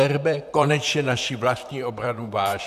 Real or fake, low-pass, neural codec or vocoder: fake; 14.4 kHz; vocoder, 44.1 kHz, 128 mel bands, Pupu-Vocoder